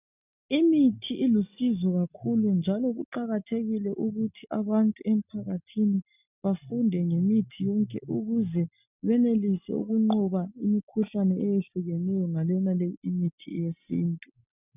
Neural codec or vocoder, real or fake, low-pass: none; real; 3.6 kHz